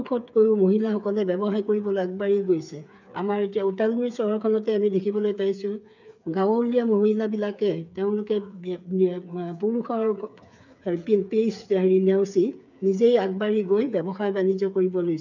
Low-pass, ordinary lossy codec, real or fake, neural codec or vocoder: 7.2 kHz; none; fake; codec, 16 kHz, 8 kbps, FreqCodec, smaller model